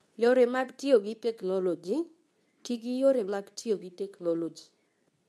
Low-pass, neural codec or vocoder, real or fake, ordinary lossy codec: none; codec, 24 kHz, 0.9 kbps, WavTokenizer, medium speech release version 2; fake; none